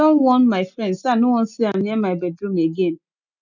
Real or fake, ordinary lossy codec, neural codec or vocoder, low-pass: real; none; none; 7.2 kHz